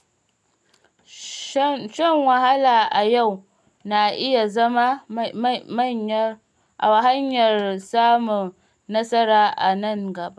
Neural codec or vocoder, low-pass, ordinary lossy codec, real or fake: none; none; none; real